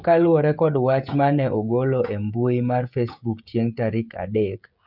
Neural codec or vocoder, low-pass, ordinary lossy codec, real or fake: codec, 16 kHz, 16 kbps, FreqCodec, smaller model; 5.4 kHz; none; fake